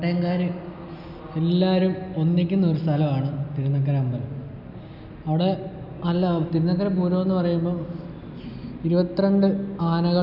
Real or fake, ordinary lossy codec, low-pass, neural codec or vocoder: real; none; 5.4 kHz; none